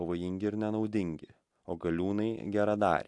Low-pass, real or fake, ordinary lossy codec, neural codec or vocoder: 10.8 kHz; real; AAC, 64 kbps; none